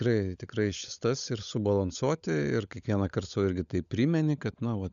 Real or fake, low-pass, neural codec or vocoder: fake; 7.2 kHz; codec, 16 kHz, 16 kbps, FunCodec, trained on LibriTTS, 50 frames a second